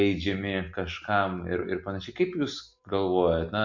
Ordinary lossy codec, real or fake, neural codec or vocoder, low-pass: MP3, 48 kbps; real; none; 7.2 kHz